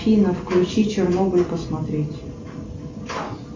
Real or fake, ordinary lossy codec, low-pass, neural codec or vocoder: real; MP3, 48 kbps; 7.2 kHz; none